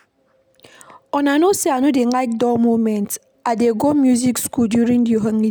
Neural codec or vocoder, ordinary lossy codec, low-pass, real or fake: none; none; none; real